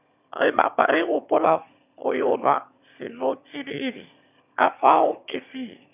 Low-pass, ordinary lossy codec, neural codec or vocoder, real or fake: 3.6 kHz; none; autoencoder, 22.05 kHz, a latent of 192 numbers a frame, VITS, trained on one speaker; fake